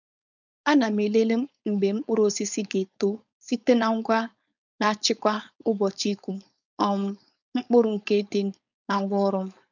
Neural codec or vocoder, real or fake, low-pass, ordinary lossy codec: codec, 16 kHz, 4.8 kbps, FACodec; fake; 7.2 kHz; none